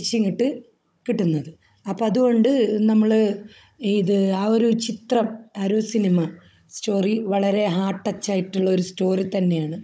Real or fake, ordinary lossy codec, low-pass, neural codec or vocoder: fake; none; none; codec, 16 kHz, 16 kbps, FunCodec, trained on Chinese and English, 50 frames a second